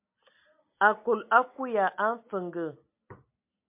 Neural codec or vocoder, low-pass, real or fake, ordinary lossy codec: none; 3.6 kHz; real; MP3, 32 kbps